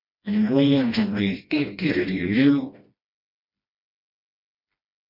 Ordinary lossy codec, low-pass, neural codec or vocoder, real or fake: MP3, 32 kbps; 5.4 kHz; codec, 16 kHz, 1 kbps, FreqCodec, smaller model; fake